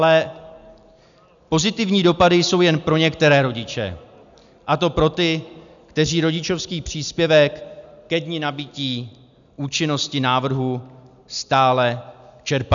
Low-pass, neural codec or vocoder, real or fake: 7.2 kHz; none; real